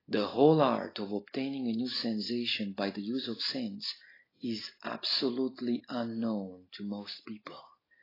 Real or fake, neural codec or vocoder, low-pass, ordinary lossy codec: real; none; 5.4 kHz; AAC, 24 kbps